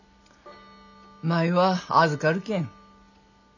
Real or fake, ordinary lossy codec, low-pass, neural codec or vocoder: real; none; 7.2 kHz; none